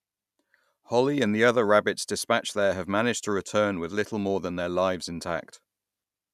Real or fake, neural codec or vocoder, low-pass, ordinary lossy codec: real; none; 14.4 kHz; none